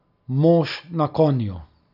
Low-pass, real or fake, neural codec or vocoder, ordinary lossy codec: 5.4 kHz; real; none; none